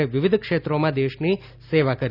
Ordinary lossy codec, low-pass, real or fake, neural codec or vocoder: none; 5.4 kHz; real; none